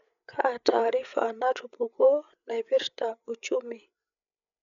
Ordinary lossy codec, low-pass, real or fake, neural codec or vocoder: none; 7.2 kHz; fake; codec, 16 kHz, 8 kbps, FreqCodec, larger model